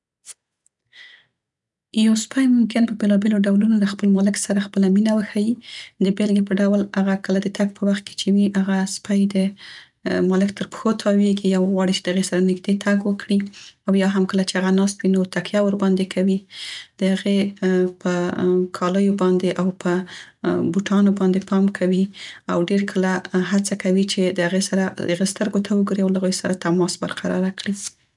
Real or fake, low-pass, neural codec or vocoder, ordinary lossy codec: real; 10.8 kHz; none; none